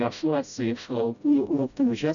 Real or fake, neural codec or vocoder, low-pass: fake; codec, 16 kHz, 0.5 kbps, FreqCodec, smaller model; 7.2 kHz